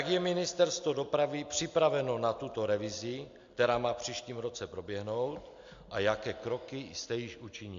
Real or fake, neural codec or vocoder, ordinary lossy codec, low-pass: real; none; AAC, 64 kbps; 7.2 kHz